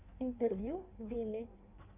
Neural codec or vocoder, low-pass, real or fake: codec, 16 kHz in and 24 kHz out, 1.1 kbps, FireRedTTS-2 codec; 3.6 kHz; fake